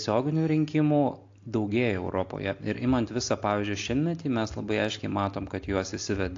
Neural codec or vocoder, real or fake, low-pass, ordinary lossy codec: none; real; 7.2 kHz; AAC, 48 kbps